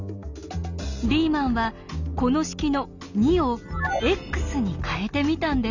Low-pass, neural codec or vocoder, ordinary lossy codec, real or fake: 7.2 kHz; none; none; real